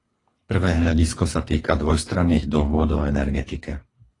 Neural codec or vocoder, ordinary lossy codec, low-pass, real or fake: codec, 24 kHz, 3 kbps, HILCodec; AAC, 32 kbps; 10.8 kHz; fake